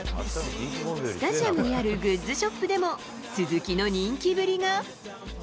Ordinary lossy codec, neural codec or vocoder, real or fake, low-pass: none; none; real; none